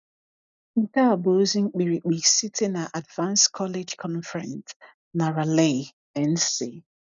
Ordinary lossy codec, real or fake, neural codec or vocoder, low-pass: none; real; none; 7.2 kHz